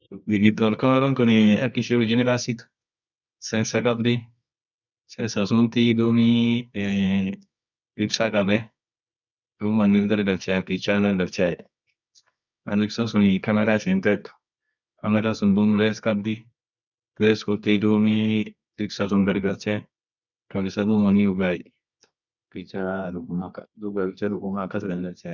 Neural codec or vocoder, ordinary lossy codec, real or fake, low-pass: codec, 24 kHz, 0.9 kbps, WavTokenizer, medium music audio release; none; fake; 7.2 kHz